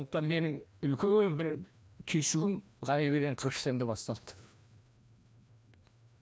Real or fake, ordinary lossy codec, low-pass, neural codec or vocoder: fake; none; none; codec, 16 kHz, 1 kbps, FreqCodec, larger model